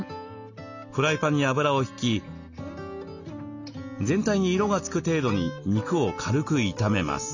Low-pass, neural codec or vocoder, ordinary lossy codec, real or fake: 7.2 kHz; none; none; real